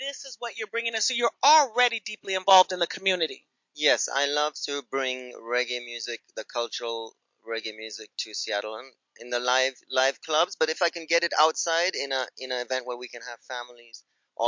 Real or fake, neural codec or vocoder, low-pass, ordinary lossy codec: real; none; 7.2 kHz; MP3, 48 kbps